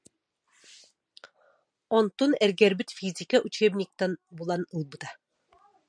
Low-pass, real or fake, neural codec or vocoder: 9.9 kHz; real; none